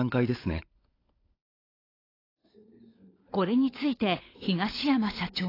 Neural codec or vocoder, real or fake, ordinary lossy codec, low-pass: codec, 16 kHz, 16 kbps, FunCodec, trained on LibriTTS, 50 frames a second; fake; AAC, 24 kbps; 5.4 kHz